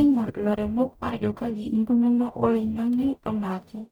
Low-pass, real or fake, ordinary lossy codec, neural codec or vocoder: none; fake; none; codec, 44.1 kHz, 0.9 kbps, DAC